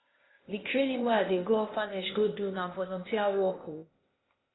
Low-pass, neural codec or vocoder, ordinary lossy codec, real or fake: 7.2 kHz; codec, 16 kHz, 0.8 kbps, ZipCodec; AAC, 16 kbps; fake